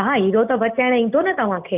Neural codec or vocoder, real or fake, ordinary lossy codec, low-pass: none; real; none; 3.6 kHz